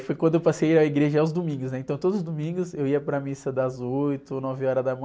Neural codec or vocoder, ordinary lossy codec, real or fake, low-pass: none; none; real; none